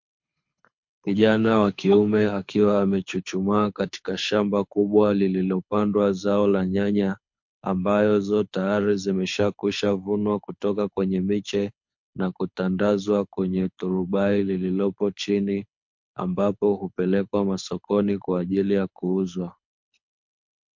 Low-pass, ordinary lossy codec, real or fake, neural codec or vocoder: 7.2 kHz; MP3, 48 kbps; fake; codec, 24 kHz, 6 kbps, HILCodec